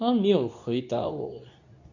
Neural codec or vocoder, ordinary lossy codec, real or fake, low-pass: codec, 24 kHz, 0.9 kbps, WavTokenizer, medium speech release version 2; none; fake; 7.2 kHz